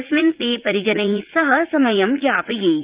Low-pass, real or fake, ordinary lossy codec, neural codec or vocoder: 3.6 kHz; fake; Opus, 32 kbps; vocoder, 44.1 kHz, 80 mel bands, Vocos